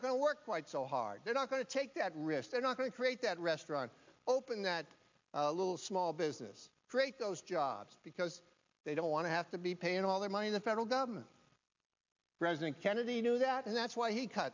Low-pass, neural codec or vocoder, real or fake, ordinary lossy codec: 7.2 kHz; none; real; MP3, 64 kbps